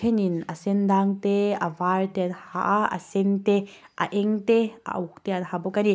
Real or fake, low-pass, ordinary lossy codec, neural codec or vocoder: real; none; none; none